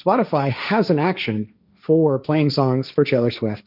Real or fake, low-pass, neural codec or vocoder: fake; 5.4 kHz; codec, 16 kHz, 1.1 kbps, Voila-Tokenizer